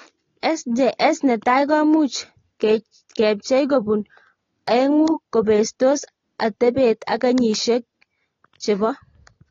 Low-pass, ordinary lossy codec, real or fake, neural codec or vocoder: 7.2 kHz; AAC, 32 kbps; real; none